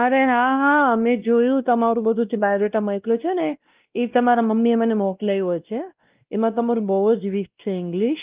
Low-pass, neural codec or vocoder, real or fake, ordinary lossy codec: 3.6 kHz; codec, 16 kHz, 1 kbps, X-Codec, WavLM features, trained on Multilingual LibriSpeech; fake; Opus, 32 kbps